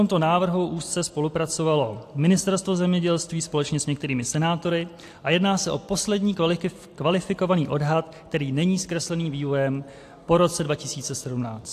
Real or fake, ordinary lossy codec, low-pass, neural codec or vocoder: real; AAC, 64 kbps; 14.4 kHz; none